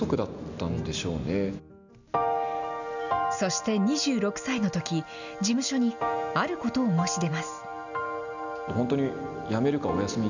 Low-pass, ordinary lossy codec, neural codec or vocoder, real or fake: 7.2 kHz; none; none; real